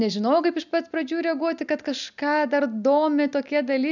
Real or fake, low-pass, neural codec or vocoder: real; 7.2 kHz; none